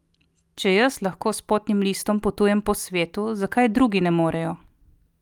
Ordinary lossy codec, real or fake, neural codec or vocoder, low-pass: Opus, 32 kbps; real; none; 19.8 kHz